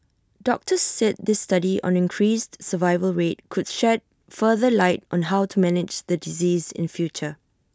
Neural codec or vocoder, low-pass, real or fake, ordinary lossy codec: none; none; real; none